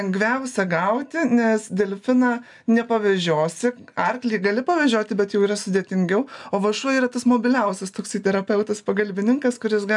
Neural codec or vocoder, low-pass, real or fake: none; 10.8 kHz; real